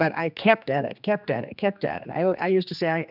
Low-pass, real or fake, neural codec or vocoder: 5.4 kHz; fake; codec, 16 kHz, 2 kbps, X-Codec, HuBERT features, trained on general audio